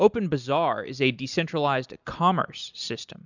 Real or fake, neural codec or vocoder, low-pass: real; none; 7.2 kHz